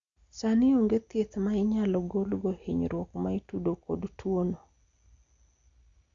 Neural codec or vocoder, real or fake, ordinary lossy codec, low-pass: none; real; Opus, 64 kbps; 7.2 kHz